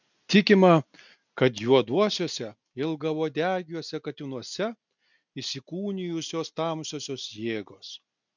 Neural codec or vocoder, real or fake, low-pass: none; real; 7.2 kHz